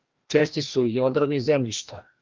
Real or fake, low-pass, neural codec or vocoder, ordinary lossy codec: fake; 7.2 kHz; codec, 16 kHz, 1 kbps, FreqCodec, larger model; Opus, 32 kbps